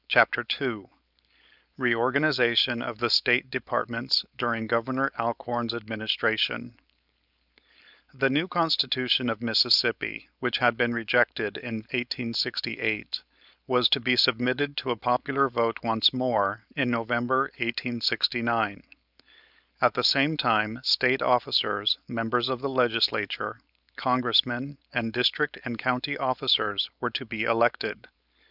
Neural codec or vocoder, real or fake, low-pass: codec, 16 kHz, 4.8 kbps, FACodec; fake; 5.4 kHz